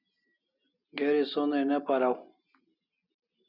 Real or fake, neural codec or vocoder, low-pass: real; none; 5.4 kHz